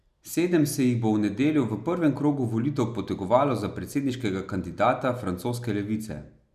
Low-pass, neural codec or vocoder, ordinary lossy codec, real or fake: 14.4 kHz; none; none; real